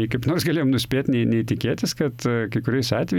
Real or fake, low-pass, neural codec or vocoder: real; 19.8 kHz; none